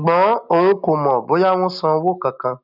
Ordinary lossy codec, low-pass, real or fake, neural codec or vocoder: none; 5.4 kHz; real; none